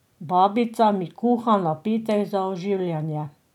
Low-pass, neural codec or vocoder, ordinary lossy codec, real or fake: 19.8 kHz; none; none; real